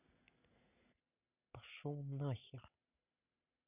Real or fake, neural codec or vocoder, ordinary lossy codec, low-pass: real; none; none; 3.6 kHz